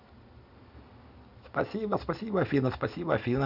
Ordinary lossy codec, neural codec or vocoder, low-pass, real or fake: none; none; 5.4 kHz; real